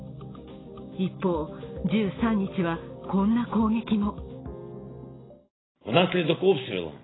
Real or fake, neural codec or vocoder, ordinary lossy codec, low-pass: real; none; AAC, 16 kbps; 7.2 kHz